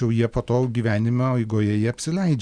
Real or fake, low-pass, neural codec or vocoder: real; 9.9 kHz; none